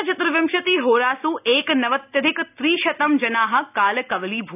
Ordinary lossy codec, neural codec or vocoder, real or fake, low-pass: none; none; real; 3.6 kHz